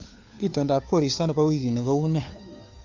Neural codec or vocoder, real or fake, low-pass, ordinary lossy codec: codec, 16 kHz, 2 kbps, FunCodec, trained on Chinese and English, 25 frames a second; fake; 7.2 kHz; AAC, 48 kbps